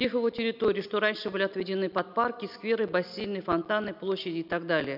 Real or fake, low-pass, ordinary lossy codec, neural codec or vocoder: real; 5.4 kHz; none; none